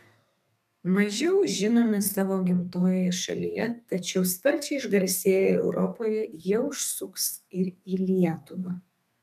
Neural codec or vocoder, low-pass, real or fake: codec, 32 kHz, 1.9 kbps, SNAC; 14.4 kHz; fake